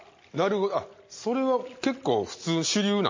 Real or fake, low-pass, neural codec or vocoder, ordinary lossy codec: real; 7.2 kHz; none; MP3, 32 kbps